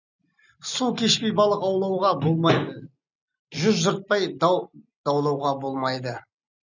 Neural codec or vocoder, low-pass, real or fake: none; 7.2 kHz; real